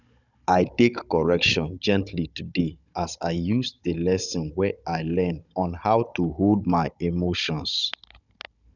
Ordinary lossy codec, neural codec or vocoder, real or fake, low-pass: none; codec, 16 kHz, 16 kbps, FunCodec, trained on Chinese and English, 50 frames a second; fake; 7.2 kHz